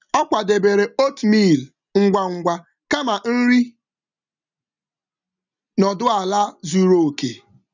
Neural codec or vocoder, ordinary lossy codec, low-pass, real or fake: none; none; 7.2 kHz; real